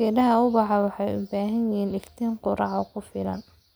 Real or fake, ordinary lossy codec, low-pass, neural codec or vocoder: real; none; none; none